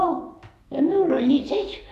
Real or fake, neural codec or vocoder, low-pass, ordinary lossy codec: fake; codec, 44.1 kHz, 2.6 kbps, SNAC; 14.4 kHz; none